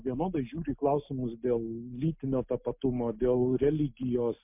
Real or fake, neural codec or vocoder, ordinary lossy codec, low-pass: real; none; MP3, 32 kbps; 3.6 kHz